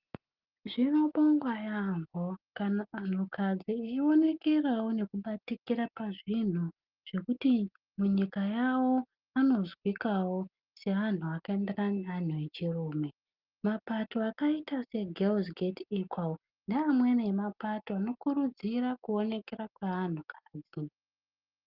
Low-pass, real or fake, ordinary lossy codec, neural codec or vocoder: 5.4 kHz; real; Opus, 24 kbps; none